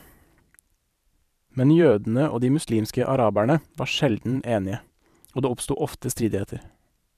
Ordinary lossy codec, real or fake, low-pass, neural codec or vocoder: none; fake; 14.4 kHz; vocoder, 44.1 kHz, 128 mel bands every 512 samples, BigVGAN v2